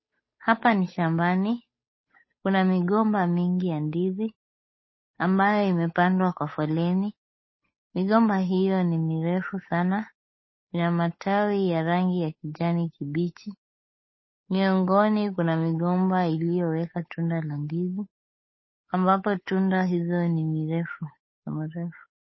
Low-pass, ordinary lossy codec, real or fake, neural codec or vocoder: 7.2 kHz; MP3, 24 kbps; fake; codec, 16 kHz, 8 kbps, FunCodec, trained on Chinese and English, 25 frames a second